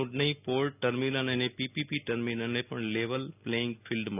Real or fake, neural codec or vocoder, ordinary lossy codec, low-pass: real; none; none; 3.6 kHz